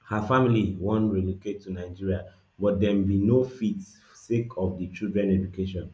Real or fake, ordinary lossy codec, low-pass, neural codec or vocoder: real; none; none; none